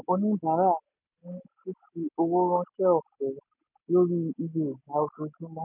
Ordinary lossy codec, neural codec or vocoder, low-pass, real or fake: none; none; 3.6 kHz; real